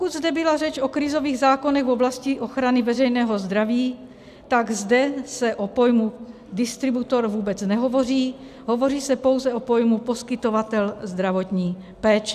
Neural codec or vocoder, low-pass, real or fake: none; 14.4 kHz; real